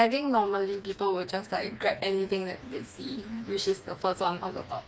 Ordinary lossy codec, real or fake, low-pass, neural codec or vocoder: none; fake; none; codec, 16 kHz, 2 kbps, FreqCodec, smaller model